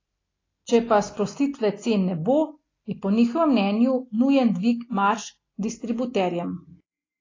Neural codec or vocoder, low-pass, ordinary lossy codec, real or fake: none; 7.2 kHz; AAC, 32 kbps; real